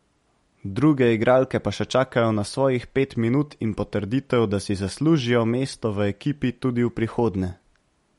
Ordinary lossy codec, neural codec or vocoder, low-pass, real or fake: MP3, 48 kbps; none; 19.8 kHz; real